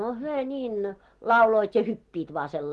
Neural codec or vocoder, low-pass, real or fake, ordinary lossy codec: none; 10.8 kHz; real; Opus, 16 kbps